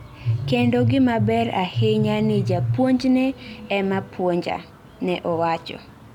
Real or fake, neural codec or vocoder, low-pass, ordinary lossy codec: real; none; 19.8 kHz; none